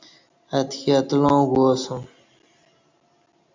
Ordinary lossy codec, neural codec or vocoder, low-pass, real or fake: MP3, 64 kbps; none; 7.2 kHz; real